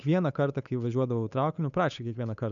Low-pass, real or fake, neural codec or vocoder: 7.2 kHz; fake; codec, 16 kHz, 8 kbps, FunCodec, trained on Chinese and English, 25 frames a second